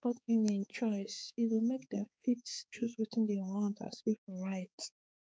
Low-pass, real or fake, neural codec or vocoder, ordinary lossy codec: none; fake; codec, 16 kHz, 4 kbps, X-Codec, HuBERT features, trained on balanced general audio; none